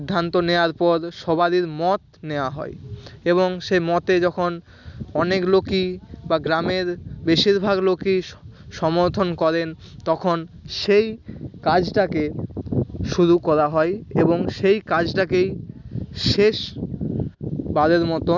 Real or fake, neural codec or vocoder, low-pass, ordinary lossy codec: real; none; 7.2 kHz; none